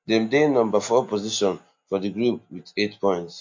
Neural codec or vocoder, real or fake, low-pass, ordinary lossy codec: none; real; 7.2 kHz; MP3, 48 kbps